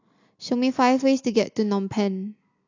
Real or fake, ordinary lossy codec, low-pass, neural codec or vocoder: real; AAC, 48 kbps; 7.2 kHz; none